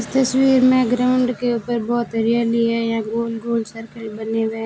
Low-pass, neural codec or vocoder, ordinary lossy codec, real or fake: none; none; none; real